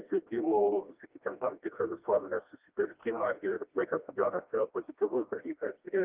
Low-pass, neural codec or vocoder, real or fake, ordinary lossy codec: 3.6 kHz; codec, 16 kHz, 1 kbps, FreqCodec, smaller model; fake; Opus, 32 kbps